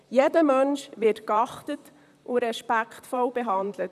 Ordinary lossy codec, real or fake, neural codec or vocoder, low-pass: none; fake; vocoder, 44.1 kHz, 128 mel bands, Pupu-Vocoder; 14.4 kHz